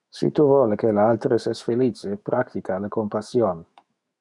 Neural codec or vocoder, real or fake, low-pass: autoencoder, 48 kHz, 128 numbers a frame, DAC-VAE, trained on Japanese speech; fake; 10.8 kHz